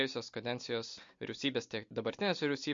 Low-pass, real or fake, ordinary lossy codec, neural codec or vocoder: 7.2 kHz; real; MP3, 48 kbps; none